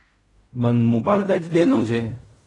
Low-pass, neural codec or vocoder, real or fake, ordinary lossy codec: 10.8 kHz; codec, 16 kHz in and 24 kHz out, 0.4 kbps, LongCat-Audio-Codec, fine tuned four codebook decoder; fake; AAC, 32 kbps